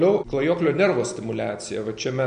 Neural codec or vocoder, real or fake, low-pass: none; real; 9.9 kHz